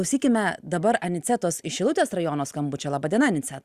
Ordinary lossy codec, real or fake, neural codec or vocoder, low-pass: Opus, 64 kbps; real; none; 14.4 kHz